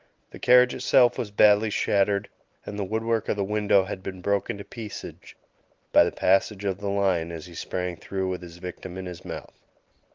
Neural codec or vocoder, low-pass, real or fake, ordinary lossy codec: none; 7.2 kHz; real; Opus, 32 kbps